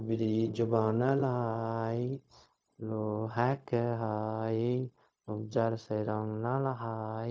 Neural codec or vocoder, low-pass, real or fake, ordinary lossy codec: codec, 16 kHz, 0.4 kbps, LongCat-Audio-Codec; none; fake; none